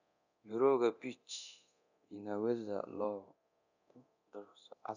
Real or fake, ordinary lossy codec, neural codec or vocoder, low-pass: fake; none; codec, 24 kHz, 0.9 kbps, DualCodec; 7.2 kHz